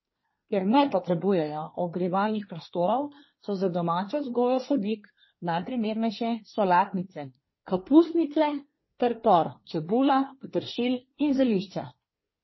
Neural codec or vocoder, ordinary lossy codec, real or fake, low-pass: codec, 24 kHz, 1 kbps, SNAC; MP3, 24 kbps; fake; 7.2 kHz